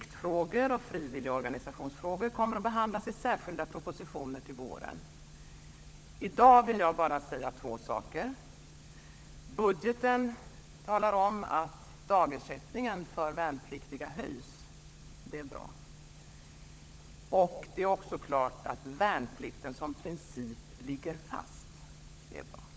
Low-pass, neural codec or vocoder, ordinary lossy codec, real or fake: none; codec, 16 kHz, 16 kbps, FunCodec, trained on LibriTTS, 50 frames a second; none; fake